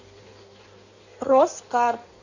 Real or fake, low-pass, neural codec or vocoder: fake; 7.2 kHz; codec, 16 kHz in and 24 kHz out, 1.1 kbps, FireRedTTS-2 codec